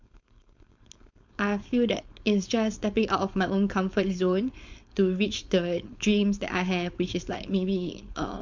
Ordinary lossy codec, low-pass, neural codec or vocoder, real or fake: MP3, 64 kbps; 7.2 kHz; codec, 16 kHz, 4.8 kbps, FACodec; fake